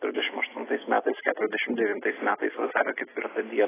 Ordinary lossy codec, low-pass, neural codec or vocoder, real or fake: AAC, 16 kbps; 3.6 kHz; none; real